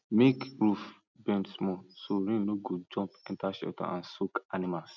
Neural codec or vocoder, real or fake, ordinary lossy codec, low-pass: none; real; none; 7.2 kHz